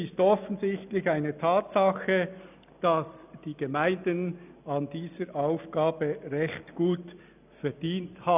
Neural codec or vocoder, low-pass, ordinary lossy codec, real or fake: none; 3.6 kHz; none; real